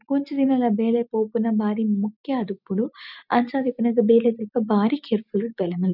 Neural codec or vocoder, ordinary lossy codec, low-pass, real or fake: none; none; 5.4 kHz; real